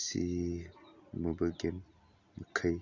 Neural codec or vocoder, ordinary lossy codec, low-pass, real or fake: none; none; 7.2 kHz; real